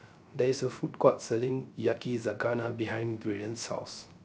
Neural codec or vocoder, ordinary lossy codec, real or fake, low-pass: codec, 16 kHz, 0.3 kbps, FocalCodec; none; fake; none